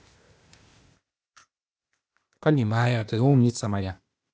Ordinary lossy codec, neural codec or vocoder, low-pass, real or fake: none; codec, 16 kHz, 0.8 kbps, ZipCodec; none; fake